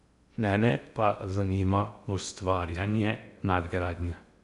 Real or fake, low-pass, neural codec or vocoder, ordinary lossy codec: fake; 10.8 kHz; codec, 16 kHz in and 24 kHz out, 0.6 kbps, FocalCodec, streaming, 2048 codes; none